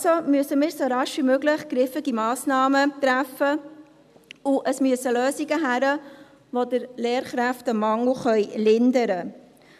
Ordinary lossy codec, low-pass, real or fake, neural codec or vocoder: none; 14.4 kHz; real; none